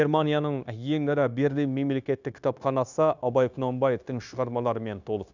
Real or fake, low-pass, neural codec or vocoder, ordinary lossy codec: fake; 7.2 kHz; codec, 16 kHz, 0.9 kbps, LongCat-Audio-Codec; none